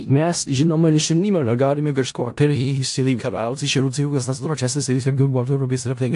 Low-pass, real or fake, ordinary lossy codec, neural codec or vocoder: 10.8 kHz; fake; AAC, 64 kbps; codec, 16 kHz in and 24 kHz out, 0.4 kbps, LongCat-Audio-Codec, four codebook decoder